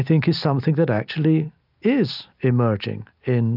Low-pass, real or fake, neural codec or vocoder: 5.4 kHz; real; none